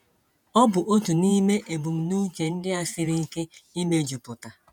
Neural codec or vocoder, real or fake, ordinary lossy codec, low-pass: vocoder, 48 kHz, 128 mel bands, Vocos; fake; none; 19.8 kHz